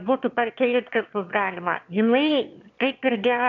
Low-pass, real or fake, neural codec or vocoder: 7.2 kHz; fake; autoencoder, 22.05 kHz, a latent of 192 numbers a frame, VITS, trained on one speaker